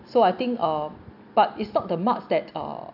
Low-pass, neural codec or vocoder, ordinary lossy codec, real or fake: 5.4 kHz; none; none; real